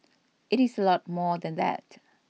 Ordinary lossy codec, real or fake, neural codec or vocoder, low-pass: none; real; none; none